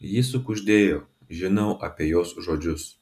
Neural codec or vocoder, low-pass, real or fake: none; 14.4 kHz; real